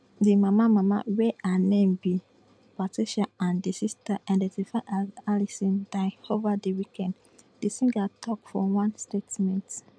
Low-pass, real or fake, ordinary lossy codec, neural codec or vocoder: none; real; none; none